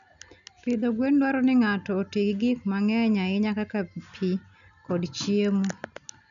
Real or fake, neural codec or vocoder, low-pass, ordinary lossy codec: real; none; 7.2 kHz; none